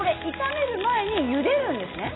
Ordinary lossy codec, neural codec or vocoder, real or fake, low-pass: AAC, 16 kbps; none; real; 7.2 kHz